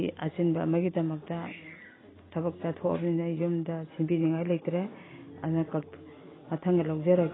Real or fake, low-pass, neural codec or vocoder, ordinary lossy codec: real; 7.2 kHz; none; AAC, 16 kbps